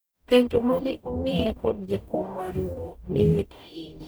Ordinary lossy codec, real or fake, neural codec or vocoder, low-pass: none; fake; codec, 44.1 kHz, 0.9 kbps, DAC; none